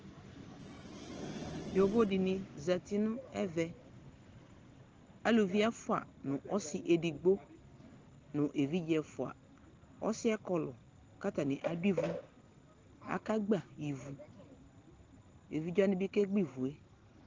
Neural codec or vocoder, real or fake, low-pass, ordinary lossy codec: none; real; 7.2 kHz; Opus, 16 kbps